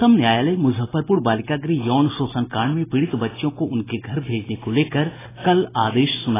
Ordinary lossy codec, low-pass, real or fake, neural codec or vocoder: AAC, 16 kbps; 3.6 kHz; real; none